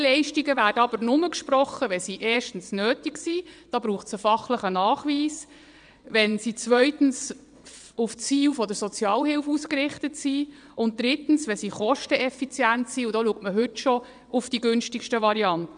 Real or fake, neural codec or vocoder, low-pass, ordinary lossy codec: fake; vocoder, 22.05 kHz, 80 mel bands, WaveNeXt; 9.9 kHz; none